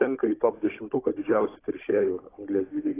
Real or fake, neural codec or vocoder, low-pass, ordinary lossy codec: fake; codec, 16 kHz, 16 kbps, FunCodec, trained on Chinese and English, 50 frames a second; 3.6 kHz; AAC, 16 kbps